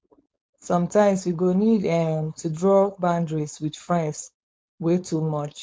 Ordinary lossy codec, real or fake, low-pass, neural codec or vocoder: none; fake; none; codec, 16 kHz, 4.8 kbps, FACodec